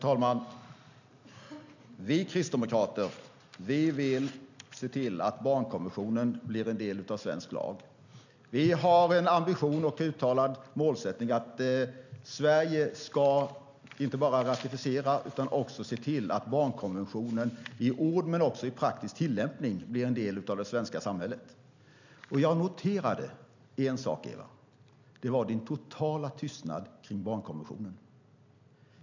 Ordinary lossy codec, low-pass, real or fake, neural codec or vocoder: none; 7.2 kHz; real; none